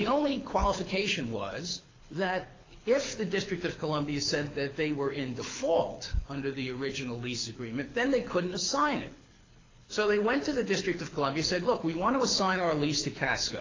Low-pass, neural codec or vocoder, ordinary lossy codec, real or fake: 7.2 kHz; codec, 24 kHz, 6 kbps, HILCodec; AAC, 32 kbps; fake